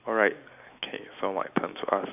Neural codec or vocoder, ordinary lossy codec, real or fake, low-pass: none; none; real; 3.6 kHz